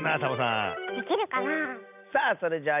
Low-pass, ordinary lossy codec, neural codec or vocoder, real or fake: 3.6 kHz; none; none; real